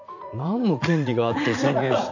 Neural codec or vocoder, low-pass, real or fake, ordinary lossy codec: vocoder, 44.1 kHz, 80 mel bands, Vocos; 7.2 kHz; fake; AAC, 48 kbps